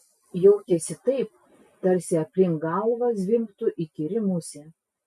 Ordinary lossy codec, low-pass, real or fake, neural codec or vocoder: MP3, 64 kbps; 14.4 kHz; real; none